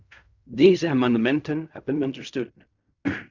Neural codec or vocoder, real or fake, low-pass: codec, 16 kHz in and 24 kHz out, 0.4 kbps, LongCat-Audio-Codec, fine tuned four codebook decoder; fake; 7.2 kHz